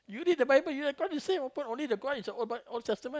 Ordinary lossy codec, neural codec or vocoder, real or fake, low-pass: none; none; real; none